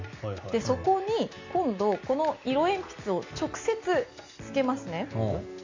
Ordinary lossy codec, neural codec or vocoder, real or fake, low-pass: MP3, 64 kbps; none; real; 7.2 kHz